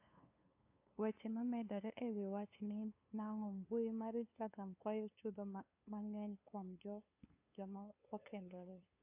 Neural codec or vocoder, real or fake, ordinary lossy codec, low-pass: codec, 16 kHz, 2 kbps, FunCodec, trained on LibriTTS, 25 frames a second; fake; AAC, 32 kbps; 3.6 kHz